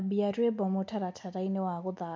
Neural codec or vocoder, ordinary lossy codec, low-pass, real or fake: none; none; none; real